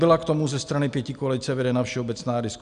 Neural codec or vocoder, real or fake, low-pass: none; real; 9.9 kHz